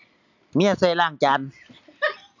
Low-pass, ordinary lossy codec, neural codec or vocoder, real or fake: 7.2 kHz; none; none; real